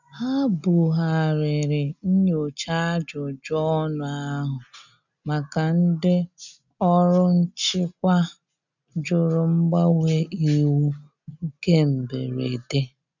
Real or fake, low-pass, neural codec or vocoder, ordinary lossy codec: real; 7.2 kHz; none; none